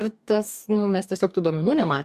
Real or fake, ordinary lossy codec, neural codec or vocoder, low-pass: fake; MP3, 96 kbps; codec, 44.1 kHz, 2.6 kbps, DAC; 14.4 kHz